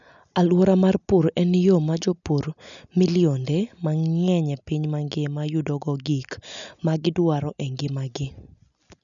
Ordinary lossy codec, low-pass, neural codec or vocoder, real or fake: none; 7.2 kHz; none; real